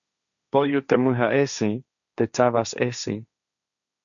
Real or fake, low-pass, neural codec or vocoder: fake; 7.2 kHz; codec, 16 kHz, 1.1 kbps, Voila-Tokenizer